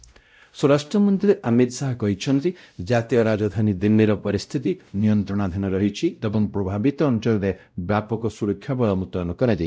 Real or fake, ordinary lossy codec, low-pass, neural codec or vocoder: fake; none; none; codec, 16 kHz, 0.5 kbps, X-Codec, WavLM features, trained on Multilingual LibriSpeech